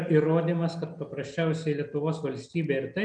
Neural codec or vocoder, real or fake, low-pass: none; real; 9.9 kHz